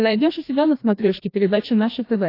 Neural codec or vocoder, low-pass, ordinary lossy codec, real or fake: codec, 16 kHz, 1 kbps, FreqCodec, larger model; 5.4 kHz; AAC, 32 kbps; fake